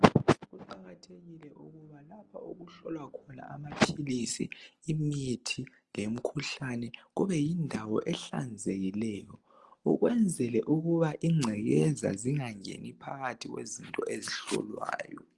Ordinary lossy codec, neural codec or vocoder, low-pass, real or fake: Opus, 24 kbps; none; 10.8 kHz; real